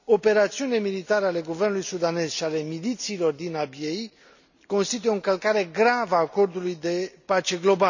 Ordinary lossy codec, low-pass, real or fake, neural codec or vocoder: none; 7.2 kHz; real; none